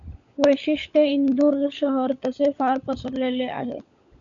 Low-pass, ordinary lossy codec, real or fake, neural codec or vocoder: 7.2 kHz; MP3, 96 kbps; fake; codec, 16 kHz, 16 kbps, FunCodec, trained on LibriTTS, 50 frames a second